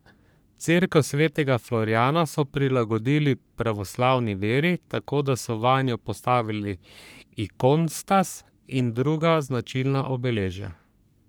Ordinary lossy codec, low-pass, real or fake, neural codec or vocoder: none; none; fake; codec, 44.1 kHz, 3.4 kbps, Pupu-Codec